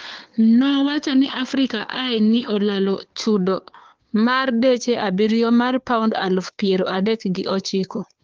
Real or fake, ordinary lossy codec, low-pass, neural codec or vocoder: fake; Opus, 24 kbps; 7.2 kHz; codec, 16 kHz, 2 kbps, FunCodec, trained on Chinese and English, 25 frames a second